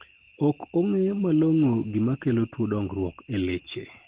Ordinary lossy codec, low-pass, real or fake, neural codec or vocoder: Opus, 16 kbps; 3.6 kHz; real; none